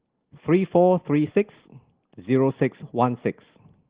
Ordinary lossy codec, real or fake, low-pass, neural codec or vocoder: Opus, 16 kbps; real; 3.6 kHz; none